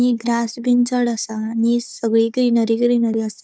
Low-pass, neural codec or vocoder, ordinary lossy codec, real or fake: none; codec, 16 kHz, 4 kbps, FunCodec, trained on Chinese and English, 50 frames a second; none; fake